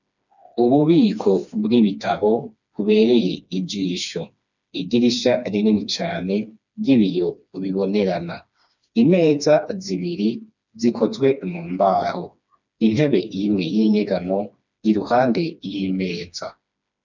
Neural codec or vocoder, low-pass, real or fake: codec, 16 kHz, 2 kbps, FreqCodec, smaller model; 7.2 kHz; fake